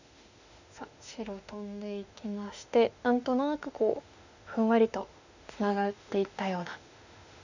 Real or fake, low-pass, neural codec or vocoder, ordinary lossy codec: fake; 7.2 kHz; autoencoder, 48 kHz, 32 numbers a frame, DAC-VAE, trained on Japanese speech; none